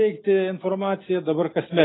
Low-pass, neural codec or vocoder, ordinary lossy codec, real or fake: 7.2 kHz; none; AAC, 16 kbps; real